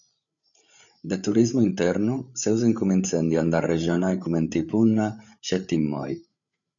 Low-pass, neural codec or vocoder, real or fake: 7.2 kHz; codec, 16 kHz, 8 kbps, FreqCodec, larger model; fake